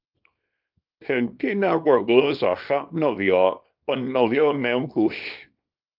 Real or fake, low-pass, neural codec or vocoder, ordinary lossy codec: fake; 5.4 kHz; codec, 24 kHz, 0.9 kbps, WavTokenizer, small release; Opus, 24 kbps